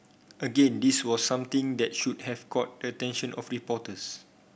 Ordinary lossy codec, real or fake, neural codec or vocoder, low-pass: none; real; none; none